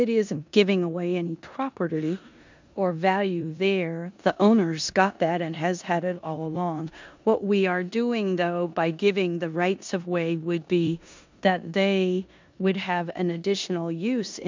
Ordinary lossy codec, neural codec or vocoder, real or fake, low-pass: MP3, 64 kbps; codec, 16 kHz in and 24 kHz out, 0.9 kbps, LongCat-Audio-Codec, four codebook decoder; fake; 7.2 kHz